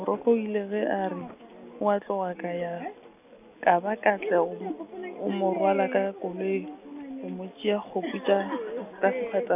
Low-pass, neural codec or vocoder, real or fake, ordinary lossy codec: 3.6 kHz; none; real; none